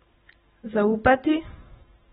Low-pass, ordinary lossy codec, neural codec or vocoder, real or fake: 19.8 kHz; AAC, 16 kbps; none; real